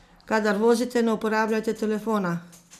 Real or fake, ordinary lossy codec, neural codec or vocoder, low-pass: real; none; none; 14.4 kHz